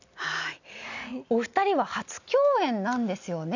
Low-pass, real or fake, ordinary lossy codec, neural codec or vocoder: 7.2 kHz; real; none; none